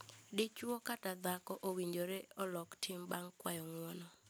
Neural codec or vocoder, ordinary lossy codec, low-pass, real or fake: none; none; none; real